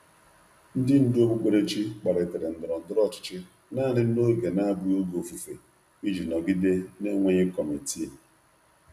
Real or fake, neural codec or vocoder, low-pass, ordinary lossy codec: real; none; 14.4 kHz; none